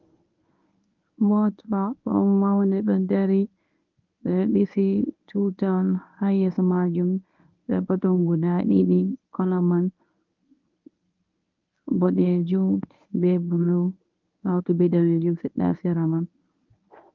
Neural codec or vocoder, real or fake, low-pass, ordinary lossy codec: codec, 24 kHz, 0.9 kbps, WavTokenizer, medium speech release version 1; fake; 7.2 kHz; Opus, 24 kbps